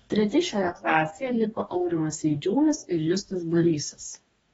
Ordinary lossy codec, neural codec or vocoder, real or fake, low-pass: AAC, 24 kbps; codec, 44.1 kHz, 2.6 kbps, DAC; fake; 19.8 kHz